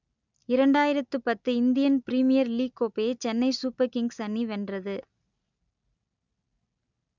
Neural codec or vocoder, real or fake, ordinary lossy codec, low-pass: none; real; none; 7.2 kHz